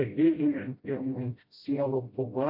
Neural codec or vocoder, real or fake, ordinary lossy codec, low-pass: codec, 16 kHz, 0.5 kbps, FreqCodec, smaller model; fake; MP3, 32 kbps; 5.4 kHz